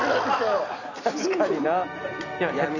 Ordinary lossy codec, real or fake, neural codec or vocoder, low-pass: none; real; none; 7.2 kHz